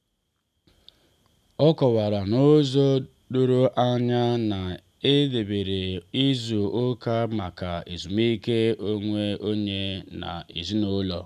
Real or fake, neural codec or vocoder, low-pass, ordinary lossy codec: real; none; 14.4 kHz; none